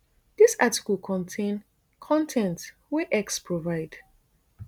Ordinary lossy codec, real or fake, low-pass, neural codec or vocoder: none; real; none; none